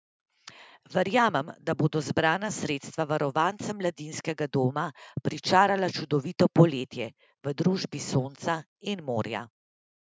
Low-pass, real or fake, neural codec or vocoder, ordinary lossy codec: none; real; none; none